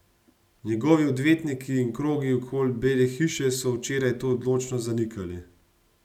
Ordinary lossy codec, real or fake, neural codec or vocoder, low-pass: none; real; none; 19.8 kHz